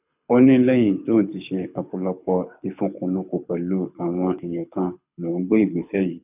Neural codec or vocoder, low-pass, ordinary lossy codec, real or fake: codec, 24 kHz, 6 kbps, HILCodec; 3.6 kHz; none; fake